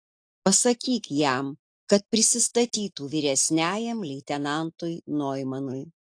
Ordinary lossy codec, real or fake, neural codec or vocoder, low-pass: AAC, 48 kbps; real; none; 9.9 kHz